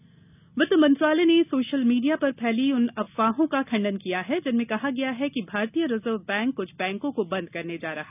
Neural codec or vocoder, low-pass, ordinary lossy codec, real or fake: none; 3.6 kHz; none; real